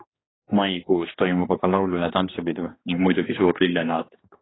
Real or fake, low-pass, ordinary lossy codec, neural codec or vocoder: fake; 7.2 kHz; AAC, 16 kbps; codec, 16 kHz, 2 kbps, X-Codec, HuBERT features, trained on general audio